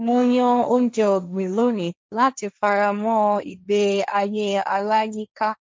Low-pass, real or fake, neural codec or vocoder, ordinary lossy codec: none; fake; codec, 16 kHz, 1.1 kbps, Voila-Tokenizer; none